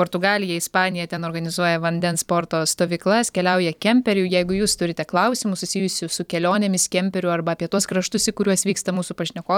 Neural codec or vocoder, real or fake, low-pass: vocoder, 44.1 kHz, 128 mel bands every 256 samples, BigVGAN v2; fake; 19.8 kHz